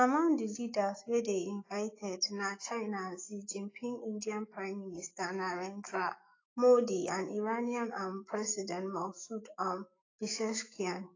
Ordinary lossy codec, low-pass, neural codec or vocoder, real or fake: AAC, 32 kbps; 7.2 kHz; autoencoder, 48 kHz, 128 numbers a frame, DAC-VAE, trained on Japanese speech; fake